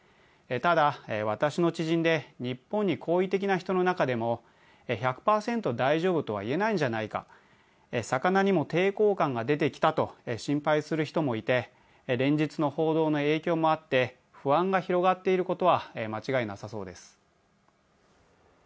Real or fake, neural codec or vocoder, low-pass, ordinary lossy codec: real; none; none; none